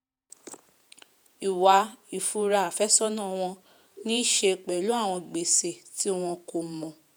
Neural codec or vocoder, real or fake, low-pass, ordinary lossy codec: vocoder, 48 kHz, 128 mel bands, Vocos; fake; none; none